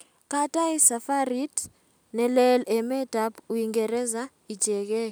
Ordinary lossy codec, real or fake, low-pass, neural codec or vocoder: none; real; none; none